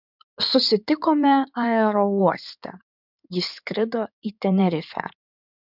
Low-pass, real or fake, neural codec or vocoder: 5.4 kHz; fake; codec, 16 kHz in and 24 kHz out, 2.2 kbps, FireRedTTS-2 codec